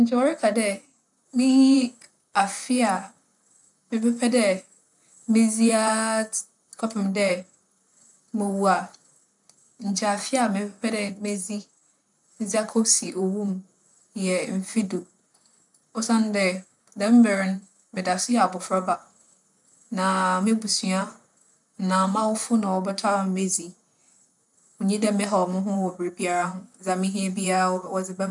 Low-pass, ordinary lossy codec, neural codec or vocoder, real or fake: 10.8 kHz; none; vocoder, 44.1 kHz, 128 mel bands, Pupu-Vocoder; fake